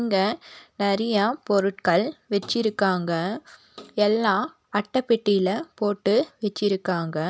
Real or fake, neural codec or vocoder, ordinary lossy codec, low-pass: real; none; none; none